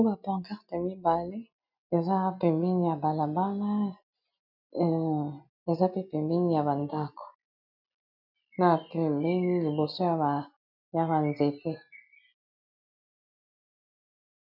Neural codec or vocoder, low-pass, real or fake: none; 5.4 kHz; real